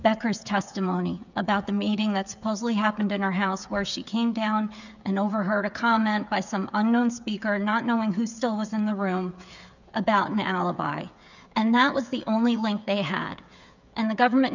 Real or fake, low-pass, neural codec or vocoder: fake; 7.2 kHz; codec, 16 kHz, 8 kbps, FreqCodec, smaller model